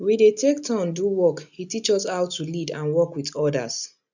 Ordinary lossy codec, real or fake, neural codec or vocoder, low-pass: none; real; none; 7.2 kHz